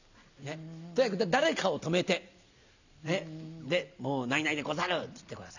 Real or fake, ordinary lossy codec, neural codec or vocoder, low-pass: real; none; none; 7.2 kHz